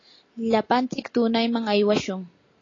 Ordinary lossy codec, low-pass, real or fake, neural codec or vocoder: AAC, 32 kbps; 7.2 kHz; real; none